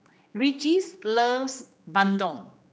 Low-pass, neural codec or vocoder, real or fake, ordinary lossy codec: none; codec, 16 kHz, 2 kbps, X-Codec, HuBERT features, trained on general audio; fake; none